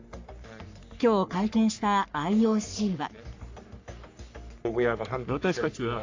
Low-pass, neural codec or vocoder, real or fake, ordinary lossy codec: 7.2 kHz; codec, 44.1 kHz, 3.4 kbps, Pupu-Codec; fake; AAC, 48 kbps